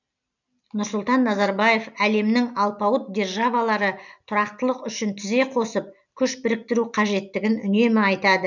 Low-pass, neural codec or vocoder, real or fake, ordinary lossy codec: 7.2 kHz; none; real; none